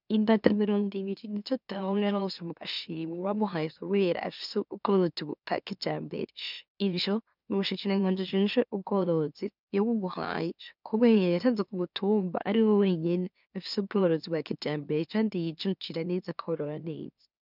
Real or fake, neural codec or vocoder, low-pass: fake; autoencoder, 44.1 kHz, a latent of 192 numbers a frame, MeloTTS; 5.4 kHz